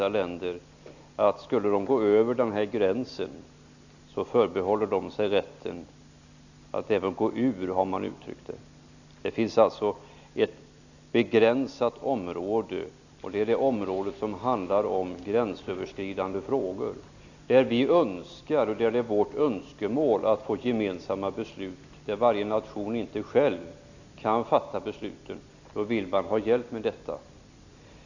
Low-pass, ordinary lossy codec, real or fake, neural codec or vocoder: 7.2 kHz; none; real; none